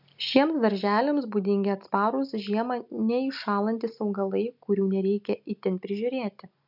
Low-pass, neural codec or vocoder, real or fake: 5.4 kHz; none; real